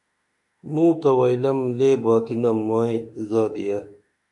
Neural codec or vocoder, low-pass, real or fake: autoencoder, 48 kHz, 32 numbers a frame, DAC-VAE, trained on Japanese speech; 10.8 kHz; fake